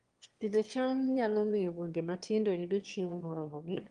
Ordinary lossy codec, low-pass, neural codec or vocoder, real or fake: Opus, 24 kbps; 9.9 kHz; autoencoder, 22.05 kHz, a latent of 192 numbers a frame, VITS, trained on one speaker; fake